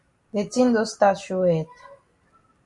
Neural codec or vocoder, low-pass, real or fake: none; 10.8 kHz; real